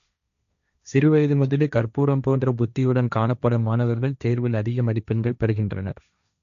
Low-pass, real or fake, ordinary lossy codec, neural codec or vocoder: 7.2 kHz; fake; none; codec, 16 kHz, 1.1 kbps, Voila-Tokenizer